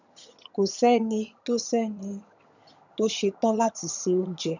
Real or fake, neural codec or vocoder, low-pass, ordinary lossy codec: fake; vocoder, 22.05 kHz, 80 mel bands, HiFi-GAN; 7.2 kHz; none